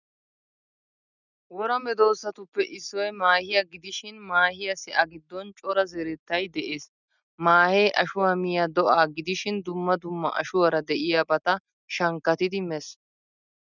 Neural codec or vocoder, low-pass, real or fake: none; 7.2 kHz; real